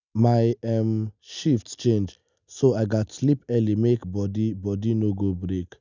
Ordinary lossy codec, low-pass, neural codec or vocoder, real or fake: none; 7.2 kHz; none; real